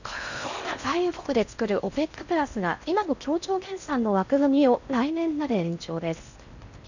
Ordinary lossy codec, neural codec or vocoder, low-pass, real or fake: none; codec, 16 kHz in and 24 kHz out, 0.6 kbps, FocalCodec, streaming, 4096 codes; 7.2 kHz; fake